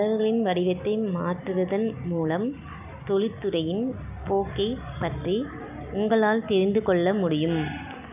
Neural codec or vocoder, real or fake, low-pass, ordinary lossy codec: none; real; 3.6 kHz; none